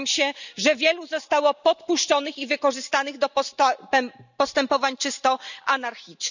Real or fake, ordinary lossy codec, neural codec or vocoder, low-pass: real; none; none; 7.2 kHz